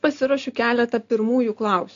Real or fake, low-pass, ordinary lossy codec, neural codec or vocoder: real; 7.2 kHz; AAC, 64 kbps; none